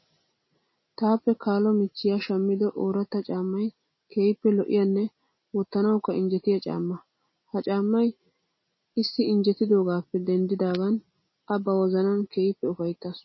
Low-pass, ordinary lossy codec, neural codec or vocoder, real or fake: 7.2 kHz; MP3, 24 kbps; none; real